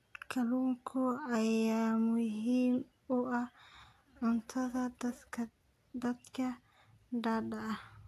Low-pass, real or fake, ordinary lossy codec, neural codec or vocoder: 14.4 kHz; real; AAC, 64 kbps; none